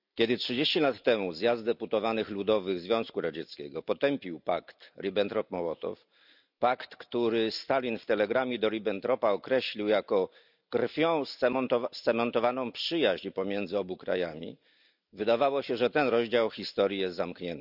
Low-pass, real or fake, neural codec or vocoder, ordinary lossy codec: 5.4 kHz; real; none; none